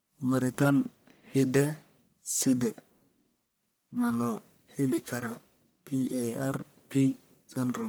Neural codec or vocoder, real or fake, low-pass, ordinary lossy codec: codec, 44.1 kHz, 1.7 kbps, Pupu-Codec; fake; none; none